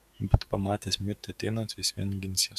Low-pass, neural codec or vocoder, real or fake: 14.4 kHz; codec, 44.1 kHz, 7.8 kbps, DAC; fake